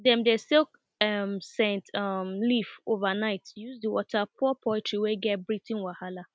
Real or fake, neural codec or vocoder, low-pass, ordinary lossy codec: real; none; none; none